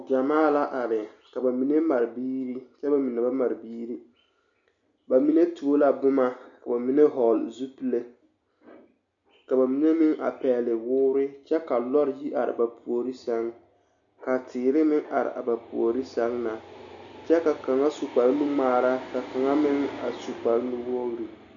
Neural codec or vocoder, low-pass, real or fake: none; 7.2 kHz; real